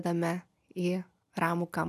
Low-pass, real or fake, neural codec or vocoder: 14.4 kHz; real; none